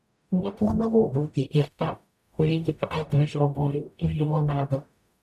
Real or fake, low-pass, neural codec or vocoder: fake; 14.4 kHz; codec, 44.1 kHz, 0.9 kbps, DAC